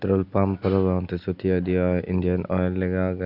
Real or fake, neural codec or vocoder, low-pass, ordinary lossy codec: real; none; 5.4 kHz; none